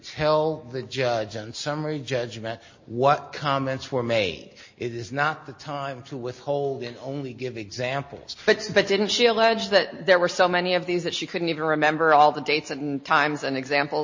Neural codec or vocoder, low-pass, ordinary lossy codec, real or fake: none; 7.2 kHz; MP3, 32 kbps; real